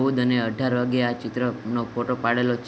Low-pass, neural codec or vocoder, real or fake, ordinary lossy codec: none; none; real; none